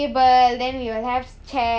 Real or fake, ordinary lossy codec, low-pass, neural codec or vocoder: real; none; none; none